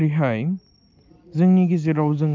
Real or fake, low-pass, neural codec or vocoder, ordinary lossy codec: real; 7.2 kHz; none; Opus, 24 kbps